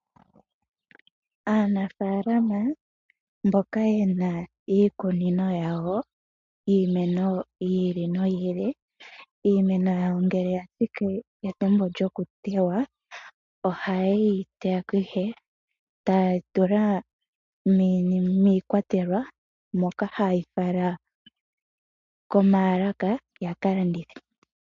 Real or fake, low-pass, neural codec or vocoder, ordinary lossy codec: real; 7.2 kHz; none; MP3, 48 kbps